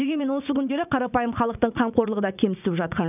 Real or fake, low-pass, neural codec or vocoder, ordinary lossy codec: fake; 3.6 kHz; vocoder, 44.1 kHz, 80 mel bands, Vocos; none